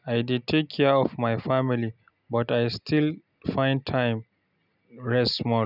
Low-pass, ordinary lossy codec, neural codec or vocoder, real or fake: 5.4 kHz; none; none; real